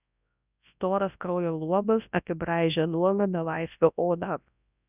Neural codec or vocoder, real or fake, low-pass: codec, 24 kHz, 0.9 kbps, WavTokenizer, large speech release; fake; 3.6 kHz